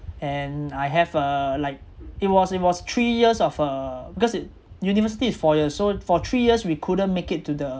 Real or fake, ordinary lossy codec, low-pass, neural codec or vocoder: real; none; none; none